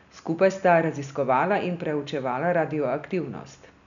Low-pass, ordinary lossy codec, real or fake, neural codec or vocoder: 7.2 kHz; none; real; none